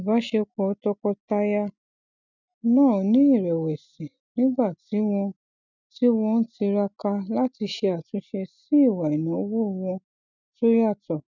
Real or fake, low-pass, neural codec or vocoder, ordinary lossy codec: real; 7.2 kHz; none; none